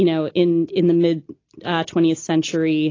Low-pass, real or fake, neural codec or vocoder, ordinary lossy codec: 7.2 kHz; real; none; AAC, 32 kbps